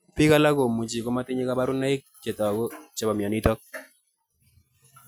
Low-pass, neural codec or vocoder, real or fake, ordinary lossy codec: none; none; real; none